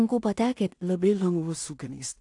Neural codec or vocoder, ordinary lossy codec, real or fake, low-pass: codec, 16 kHz in and 24 kHz out, 0.4 kbps, LongCat-Audio-Codec, two codebook decoder; AAC, 64 kbps; fake; 10.8 kHz